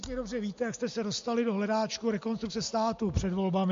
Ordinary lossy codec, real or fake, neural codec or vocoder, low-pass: MP3, 48 kbps; real; none; 7.2 kHz